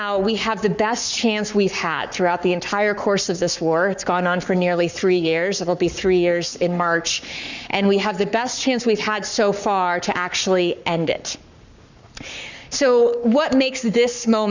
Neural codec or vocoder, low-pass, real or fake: codec, 44.1 kHz, 7.8 kbps, Pupu-Codec; 7.2 kHz; fake